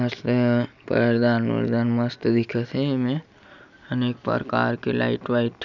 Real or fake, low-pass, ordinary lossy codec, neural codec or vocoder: real; 7.2 kHz; none; none